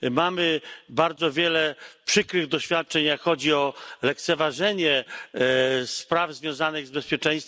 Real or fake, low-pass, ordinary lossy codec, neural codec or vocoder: real; none; none; none